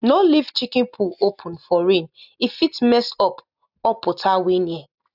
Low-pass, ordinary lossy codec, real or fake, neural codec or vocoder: 5.4 kHz; none; real; none